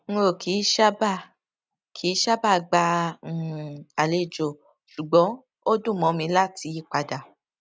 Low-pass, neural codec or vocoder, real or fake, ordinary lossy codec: none; none; real; none